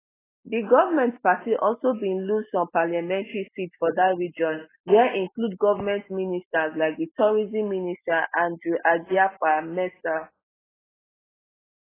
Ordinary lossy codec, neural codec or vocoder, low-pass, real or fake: AAC, 16 kbps; none; 3.6 kHz; real